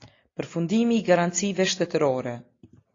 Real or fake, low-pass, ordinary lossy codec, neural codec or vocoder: real; 7.2 kHz; AAC, 32 kbps; none